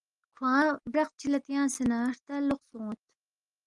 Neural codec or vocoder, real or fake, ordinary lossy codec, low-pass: none; real; Opus, 24 kbps; 10.8 kHz